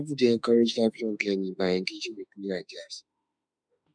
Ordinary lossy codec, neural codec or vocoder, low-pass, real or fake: none; autoencoder, 48 kHz, 32 numbers a frame, DAC-VAE, trained on Japanese speech; 9.9 kHz; fake